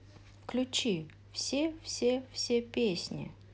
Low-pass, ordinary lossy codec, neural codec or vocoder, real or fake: none; none; none; real